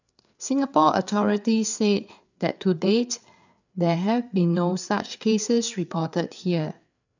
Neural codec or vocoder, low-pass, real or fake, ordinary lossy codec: codec, 16 kHz, 4 kbps, FreqCodec, larger model; 7.2 kHz; fake; none